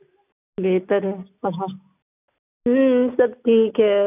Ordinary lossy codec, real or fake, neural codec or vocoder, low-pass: none; real; none; 3.6 kHz